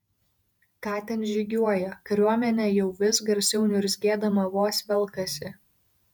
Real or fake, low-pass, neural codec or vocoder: fake; 19.8 kHz; vocoder, 48 kHz, 128 mel bands, Vocos